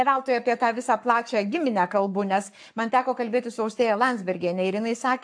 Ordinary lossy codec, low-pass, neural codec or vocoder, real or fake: AAC, 64 kbps; 9.9 kHz; codec, 44.1 kHz, 7.8 kbps, Pupu-Codec; fake